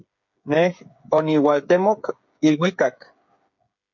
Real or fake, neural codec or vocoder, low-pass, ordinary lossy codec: fake; codec, 16 kHz, 8 kbps, FreqCodec, smaller model; 7.2 kHz; MP3, 48 kbps